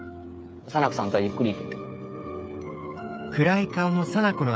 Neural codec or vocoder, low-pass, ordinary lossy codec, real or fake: codec, 16 kHz, 8 kbps, FreqCodec, smaller model; none; none; fake